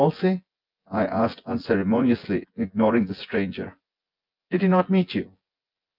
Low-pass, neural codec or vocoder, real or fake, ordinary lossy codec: 5.4 kHz; vocoder, 24 kHz, 100 mel bands, Vocos; fake; Opus, 24 kbps